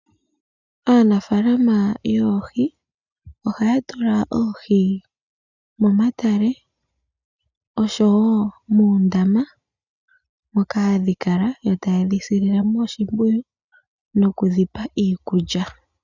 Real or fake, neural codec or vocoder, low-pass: real; none; 7.2 kHz